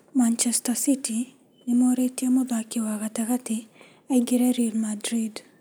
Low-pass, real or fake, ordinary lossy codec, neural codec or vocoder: none; real; none; none